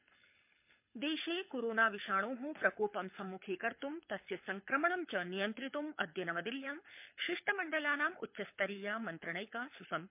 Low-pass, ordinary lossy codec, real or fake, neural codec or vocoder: 3.6 kHz; MP3, 32 kbps; fake; codec, 24 kHz, 6 kbps, HILCodec